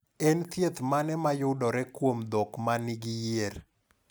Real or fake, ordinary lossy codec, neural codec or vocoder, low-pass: real; none; none; none